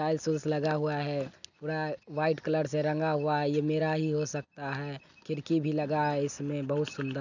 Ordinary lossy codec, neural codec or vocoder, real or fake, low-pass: none; none; real; 7.2 kHz